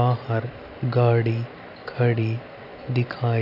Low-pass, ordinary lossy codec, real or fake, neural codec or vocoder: 5.4 kHz; AAC, 32 kbps; real; none